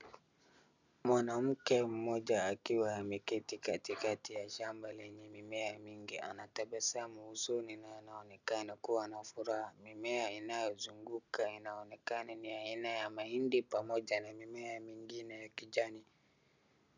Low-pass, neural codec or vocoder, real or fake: 7.2 kHz; none; real